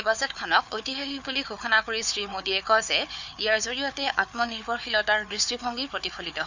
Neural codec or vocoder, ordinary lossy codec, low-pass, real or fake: codec, 16 kHz, 4 kbps, FunCodec, trained on Chinese and English, 50 frames a second; none; 7.2 kHz; fake